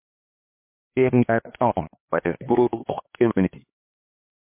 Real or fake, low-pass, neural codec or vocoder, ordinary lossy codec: fake; 3.6 kHz; codec, 16 kHz, 2 kbps, X-Codec, HuBERT features, trained on LibriSpeech; MP3, 24 kbps